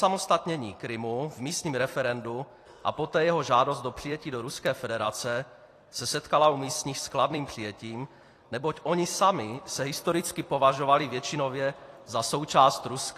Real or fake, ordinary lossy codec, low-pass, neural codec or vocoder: fake; AAC, 48 kbps; 14.4 kHz; vocoder, 44.1 kHz, 128 mel bands every 256 samples, BigVGAN v2